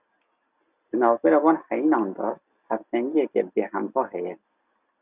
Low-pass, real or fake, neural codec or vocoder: 3.6 kHz; real; none